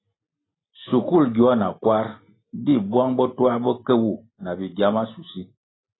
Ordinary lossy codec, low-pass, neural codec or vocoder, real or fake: AAC, 16 kbps; 7.2 kHz; none; real